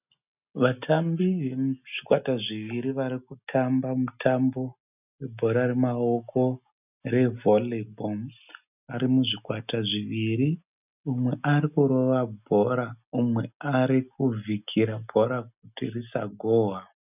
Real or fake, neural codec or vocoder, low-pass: real; none; 3.6 kHz